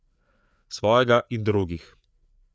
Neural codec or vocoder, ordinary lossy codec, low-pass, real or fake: codec, 16 kHz, 4 kbps, FreqCodec, larger model; none; none; fake